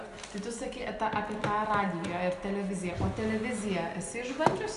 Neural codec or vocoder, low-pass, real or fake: none; 10.8 kHz; real